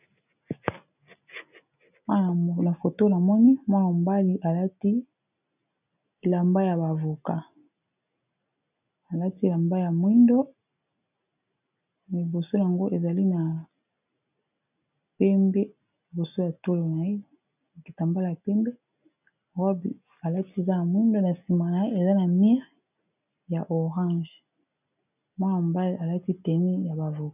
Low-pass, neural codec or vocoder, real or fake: 3.6 kHz; none; real